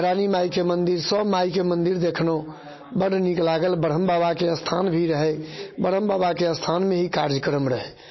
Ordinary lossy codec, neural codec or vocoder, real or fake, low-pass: MP3, 24 kbps; none; real; 7.2 kHz